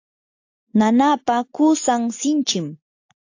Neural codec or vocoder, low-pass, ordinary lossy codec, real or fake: none; 7.2 kHz; AAC, 48 kbps; real